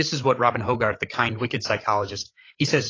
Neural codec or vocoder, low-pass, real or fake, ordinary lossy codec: codec, 16 kHz, 8 kbps, FreqCodec, larger model; 7.2 kHz; fake; AAC, 32 kbps